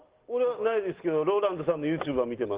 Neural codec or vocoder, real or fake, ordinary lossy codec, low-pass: none; real; Opus, 16 kbps; 3.6 kHz